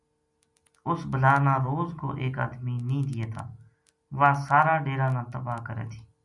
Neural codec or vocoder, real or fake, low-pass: none; real; 10.8 kHz